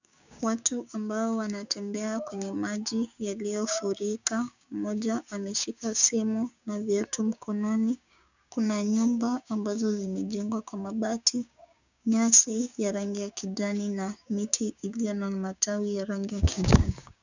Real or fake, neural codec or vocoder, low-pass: fake; codec, 16 kHz, 6 kbps, DAC; 7.2 kHz